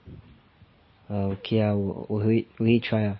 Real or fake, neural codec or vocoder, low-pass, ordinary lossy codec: real; none; 7.2 kHz; MP3, 24 kbps